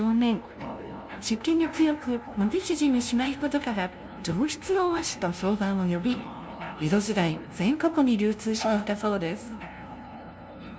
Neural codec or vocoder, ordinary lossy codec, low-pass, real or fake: codec, 16 kHz, 0.5 kbps, FunCodec, trained on LibriTTS, 25 frames a second; none; none; fake